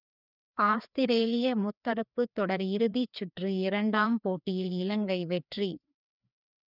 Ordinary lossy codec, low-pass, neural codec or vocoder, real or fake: none; 5.4 kHz; codec, 16 kHz, 2 kbps, FreqCodec, larger model; fake